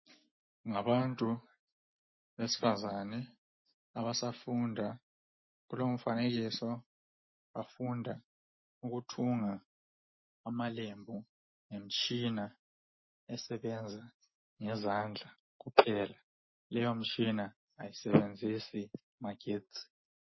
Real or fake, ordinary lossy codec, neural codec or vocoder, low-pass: real; MP3, 24 kbps; none; 7.2 kHz